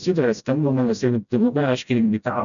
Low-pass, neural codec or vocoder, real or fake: 7.2 kHz; codec, 16 kHz, 0.5 kbps, FreqCodec, smaller model; fake